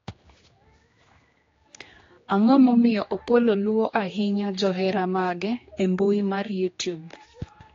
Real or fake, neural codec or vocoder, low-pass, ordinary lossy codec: fake; codec, 16 kHz, 2 kbps, X-Codec, HuBERT features, trained on general audio; 7.2 kHz; AAC, 32 kbps